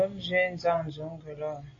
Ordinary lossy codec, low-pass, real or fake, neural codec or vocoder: AAC, 32 kbps; 7.2 kHz; real; none